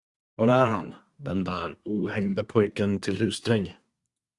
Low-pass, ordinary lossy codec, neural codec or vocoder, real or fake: 10.8 kHz; Opus, 64 kbps; codec, 24 kHz, 1 kbps, SNAC; fake